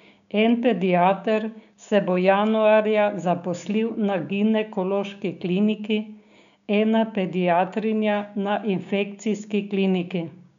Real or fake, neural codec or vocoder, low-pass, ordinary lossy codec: fake; codec, 16 kHz, 6 kbps, DAC; 7.2 kHz; none